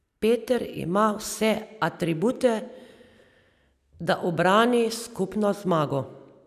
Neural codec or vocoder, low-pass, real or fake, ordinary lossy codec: none; 14.4 kHz; real; none